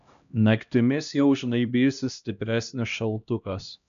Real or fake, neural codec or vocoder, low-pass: fake; codec, 16 kHz, 1 kbps, X-Codec, HuBERT features, trained on LibriSpeech; 7.2 kHz